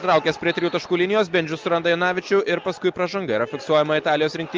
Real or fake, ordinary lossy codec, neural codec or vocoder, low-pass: real; Opus, 24 kbps; none; 7.2 kHz